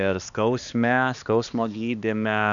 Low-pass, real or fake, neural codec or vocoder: 7.2 kHz; fake; codec, 16 kHz, 2 kbps, X-Codec, HuBERT features, trained on balanced general audio